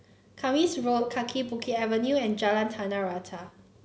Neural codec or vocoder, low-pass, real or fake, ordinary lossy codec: none; none; real; none